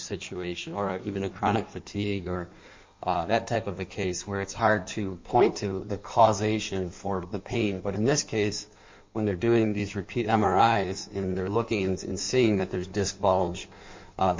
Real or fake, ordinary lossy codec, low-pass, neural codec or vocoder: fake; MP3, 48 kbps; 7.2 kHz; codec, 16 kHz in and 24 kHz out, 1.1 kbps, FireRedTTS-2 codec